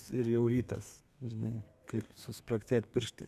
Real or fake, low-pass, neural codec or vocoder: fake; 14.4 kHz; codec, 32 kHz, 1.9 kbps, SNAC